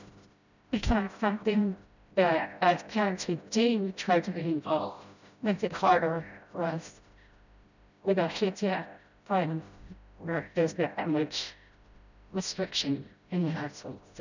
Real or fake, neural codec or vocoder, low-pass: fake; codec, 16 kHz, 0.5 kbps, FreqCodec, smaller model; 7.2 kHz